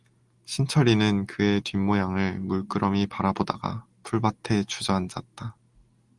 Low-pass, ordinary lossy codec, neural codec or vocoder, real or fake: 10.8 kHz; Opus, 24 kbps; none; real